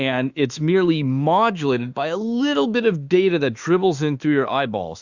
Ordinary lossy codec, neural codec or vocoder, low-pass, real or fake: Opus, 64 kbps; autoencoder, 48 kHz, 32 numbers a frame, DAC-VAE, trained on Japanese speech; 7.2 kHz; fake